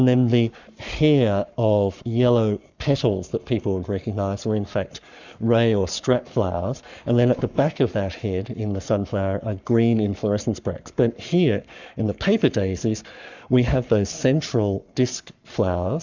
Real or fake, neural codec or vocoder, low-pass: fake; codec, 44.1 kHz, 7.8 kbps, Pupu-Codec; 7.2 kHz